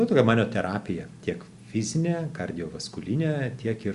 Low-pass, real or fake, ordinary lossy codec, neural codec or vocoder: 10.8 kHz; real; Opus, 64 kbps; none